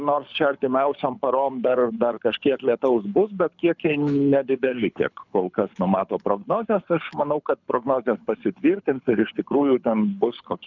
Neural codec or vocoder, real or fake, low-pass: codec, 24 kHz, 6 kbps, HILCodec; fake; 7.2 kHz